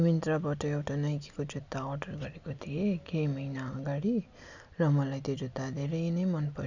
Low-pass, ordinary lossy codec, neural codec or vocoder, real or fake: 7.2 kHz; none; none; real